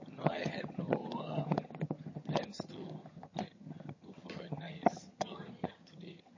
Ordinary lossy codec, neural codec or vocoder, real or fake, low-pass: MP3, 32 kbps; vocoder, 22.05 kHz, 80 mel bands, HiFi-GAN; fake; 7.2 kHz